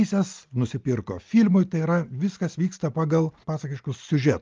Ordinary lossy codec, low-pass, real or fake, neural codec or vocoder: Opus, 32 kbps; 7.2 kHz; real; none